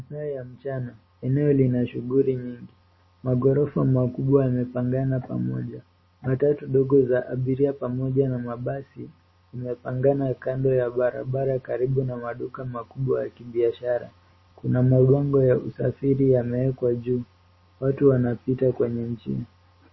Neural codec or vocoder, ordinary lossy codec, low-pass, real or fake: none; MP3, 24 kbps; 7.2 kHz; real